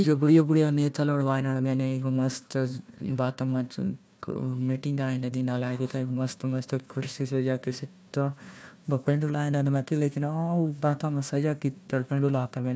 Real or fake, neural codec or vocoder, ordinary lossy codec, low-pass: fake; codec, 16 kHz, 1 kbps, FunCodec, trained on Chinese and English, 50 frames a second; none; none